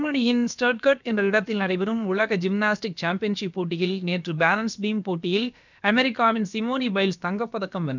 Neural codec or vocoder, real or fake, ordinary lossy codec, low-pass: codec, 16 kHz, about 1 kbps, DyCAST, with the encoder's durations; fake; none; 7.2 kHz